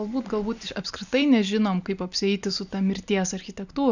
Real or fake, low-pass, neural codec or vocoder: real; 7.2 kHz; none